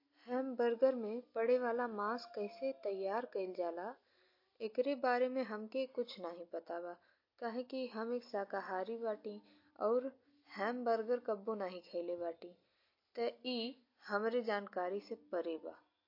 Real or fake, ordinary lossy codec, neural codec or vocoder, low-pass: real; MP3, 32 kbps; none; 5.4 kHz